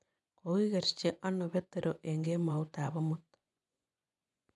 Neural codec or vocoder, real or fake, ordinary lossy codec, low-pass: vocoder, 24 kHz, 100 mel bands, Vocos; fake; none; none